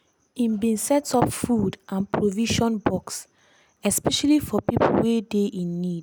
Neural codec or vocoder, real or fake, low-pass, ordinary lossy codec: none; real; none; none